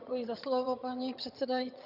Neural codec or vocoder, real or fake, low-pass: vocoder, 22.05 kHz, 80 mel bands, HiFi-GAN; fake; 5.4 kHz